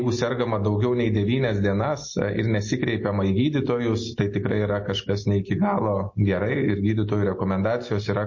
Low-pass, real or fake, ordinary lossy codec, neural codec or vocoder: 7.2 kHz; real; MP3, 32 kbps; none